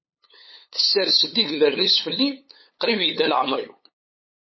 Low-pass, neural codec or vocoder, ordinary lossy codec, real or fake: 7.2 kHz; codec, 16 kHz, 8 kbps, FunCodec, trained on LibriTTS, 25 frames a second; MP3, 24 kbps; fake